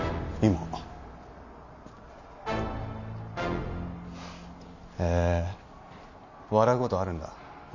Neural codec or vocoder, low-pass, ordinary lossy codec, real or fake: none; 7.2 kHz; none; real